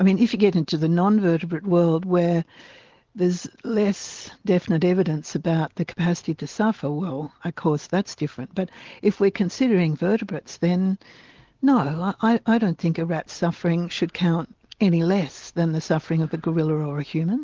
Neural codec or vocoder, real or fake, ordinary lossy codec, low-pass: none; real; Opus, 16 kbps; 7.2 kHz